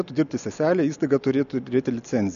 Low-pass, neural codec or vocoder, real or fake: 7.2 kHz; none; real